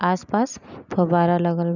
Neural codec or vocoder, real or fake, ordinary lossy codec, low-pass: none; real; none; 7.2 kHz